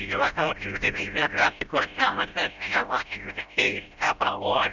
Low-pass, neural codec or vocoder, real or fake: 7.2 kHz; codec, 16 kHz, 0.5 kbps, FreqCodec, smaller model; fake